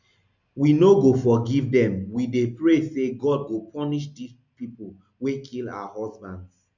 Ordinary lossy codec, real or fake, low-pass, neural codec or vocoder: none; real; 7.2 kHz; none